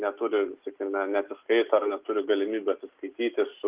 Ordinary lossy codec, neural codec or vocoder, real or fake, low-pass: Opus, 24 kbps; none; real; 3.6 kHz